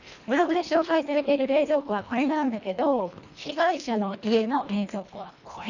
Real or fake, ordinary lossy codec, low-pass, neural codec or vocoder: fake; none; 7.2 kHz; codec, 24 kHz, 1.5 kbps, HILCodec